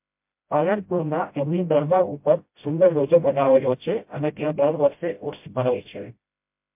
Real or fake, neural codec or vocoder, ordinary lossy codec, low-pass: fake; codec, 16 kHz, 0.5 kbps, FreqCodec, smaller model; MP3, 32 kbps; 3.6 kHz